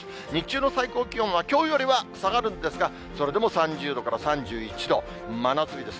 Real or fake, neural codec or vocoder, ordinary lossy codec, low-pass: real; none; none; none